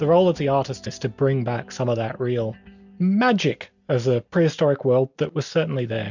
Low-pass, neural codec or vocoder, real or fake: 7.2 kHz; none; real